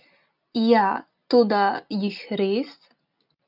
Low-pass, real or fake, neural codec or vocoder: 5.4 kHz; real; none